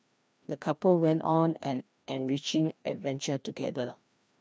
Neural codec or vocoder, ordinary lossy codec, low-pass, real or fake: codec, 16 kHz, 1 kbps, FreqCodec, larger model; none; none; fake